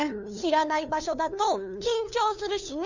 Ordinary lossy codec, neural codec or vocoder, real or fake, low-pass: none; codec, 16 kHz, 2 kbps, FunCodec, trained on LibriTTS, 25 frames a second; fake; 7.2 kHz